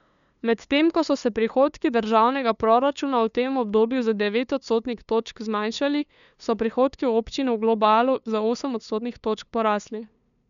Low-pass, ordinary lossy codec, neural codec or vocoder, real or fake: 7.2 kHz; none; codec, 16 kHz, 2 kbps, FunCodec, trained on LibriTTS, 25 frames a second; fake